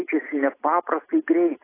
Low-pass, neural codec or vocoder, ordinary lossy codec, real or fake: 3.6 kHz; none; AAC, 16 kbps; real